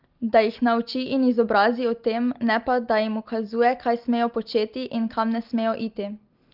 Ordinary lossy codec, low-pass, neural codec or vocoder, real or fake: Opus, 32 kbps; 5.4 kHz; none; real